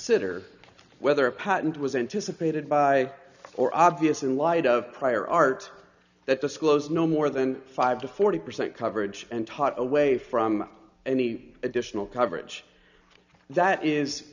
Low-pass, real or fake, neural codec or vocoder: 7.2 kHz; real; none